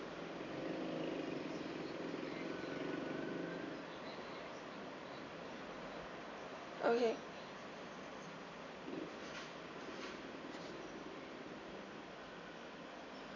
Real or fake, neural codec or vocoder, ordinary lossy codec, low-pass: real; none; none; 7.2 kHz